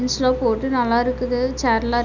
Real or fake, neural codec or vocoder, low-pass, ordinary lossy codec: real; none; 7.2 kHz; none